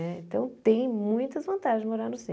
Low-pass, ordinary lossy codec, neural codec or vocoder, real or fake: none; none; none; real